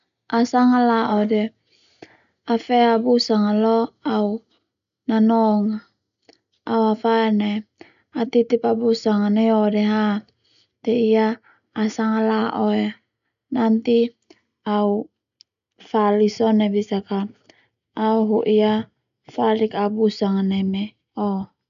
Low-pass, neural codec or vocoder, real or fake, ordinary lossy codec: 7.2 kHz; none; real; none